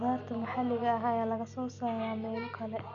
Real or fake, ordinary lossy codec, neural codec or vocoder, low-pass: real; none; none; 7.2 kHz